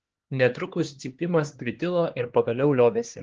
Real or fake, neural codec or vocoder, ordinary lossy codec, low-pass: fake; codec, 16 kHz, 2 kbps, X-Codec, HuBERT features, trained on LibriSpeech; Opus, 16 kbps; 7.2 kHz